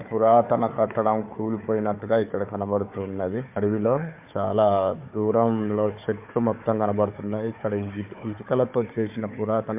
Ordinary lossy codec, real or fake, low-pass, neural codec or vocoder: MP3, 32 kbps; fake; 3.6 kHz; codec, 16 kHz, 4 kbps, FunCodec, trained on Chinese and English, 50 frames a second